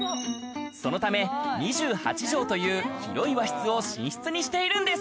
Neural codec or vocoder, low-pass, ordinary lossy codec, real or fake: none; none; none; real